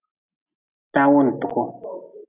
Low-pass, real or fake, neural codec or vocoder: 3.6 kHz; real; none